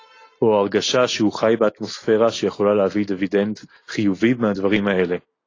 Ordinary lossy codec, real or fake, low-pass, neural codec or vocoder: AAC, 32 kbps; real; 7.2 kHz; none